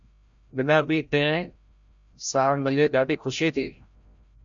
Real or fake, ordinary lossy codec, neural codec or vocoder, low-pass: fake; MP3, 64 kbps; codec, 16 kHz, 0.5 kbps, FreqCodec, larger model; 7.2 kHz